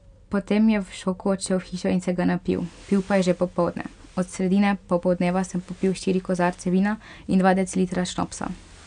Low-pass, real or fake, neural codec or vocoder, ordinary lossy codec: 9.9 kHz; real; none; none